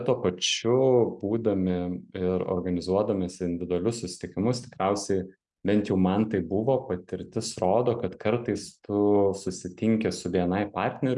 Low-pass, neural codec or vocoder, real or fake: 10.8 kHz; none; real